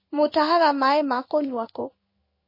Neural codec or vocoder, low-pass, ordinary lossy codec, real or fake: codec, 16 kHz in and 24 kHz out, 1 kbps, XY-Tokenizer; 5.4 kHz; MP3, 24 kbps; fake